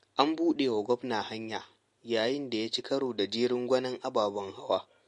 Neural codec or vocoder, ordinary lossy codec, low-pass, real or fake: none; MP3, 48 kbps; 10.8 kHz; real